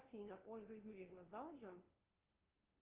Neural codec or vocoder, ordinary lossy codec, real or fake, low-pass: codec, 16 kHz, 0.5 kbps, FunCodec, trained on LibriTTS, 25 frames a second; Opus, 16 kbps; fake; 3.6 kHz